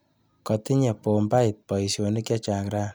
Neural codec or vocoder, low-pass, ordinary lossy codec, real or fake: none; none; none; real